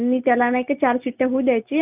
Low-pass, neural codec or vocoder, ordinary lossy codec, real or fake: 3.6 kHz; none; none; real